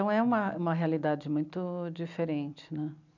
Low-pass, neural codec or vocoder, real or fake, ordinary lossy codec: 7.2 kHz; none; real; none